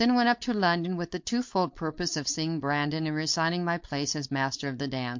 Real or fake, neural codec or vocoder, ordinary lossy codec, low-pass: fake; codec, 16 kHz, 4.8 kbps, FACodec; MP3, 48 kbps; 7.2 kHz